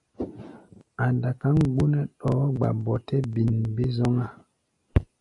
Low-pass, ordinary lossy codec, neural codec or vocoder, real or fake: 10.8 kHz; AAC, 64 kbps; none; real